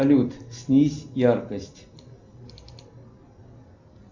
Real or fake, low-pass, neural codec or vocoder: real; 7.2 kHz; none